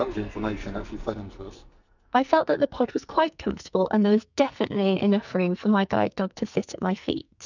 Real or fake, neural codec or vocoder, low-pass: fake; codec, 44.1 kHz, 2.6 kbps, SNAC; 7.2 kHz